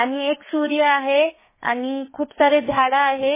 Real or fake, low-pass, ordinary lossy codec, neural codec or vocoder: fake; 3.6 kHz; MP3, 16 kbps; codec, 32 kHz, 1.9 kbps, SNAC